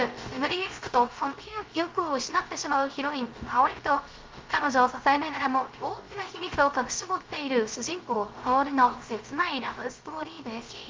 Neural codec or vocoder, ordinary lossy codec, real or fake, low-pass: codec, 16 kHz, 0.3 kbps, FocalCodec; Opus, 32 kbps; fake; 7.2 kHz